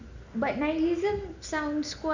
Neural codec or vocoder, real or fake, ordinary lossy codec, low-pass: none; real; none; 7.2 kHz